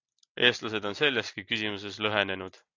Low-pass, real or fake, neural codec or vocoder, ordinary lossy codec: 7.2 kHz; real; none; AAC, 48 kbps